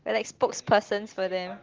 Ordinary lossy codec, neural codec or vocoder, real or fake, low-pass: Opus, 16 kbps; none; real; 7.2 kHz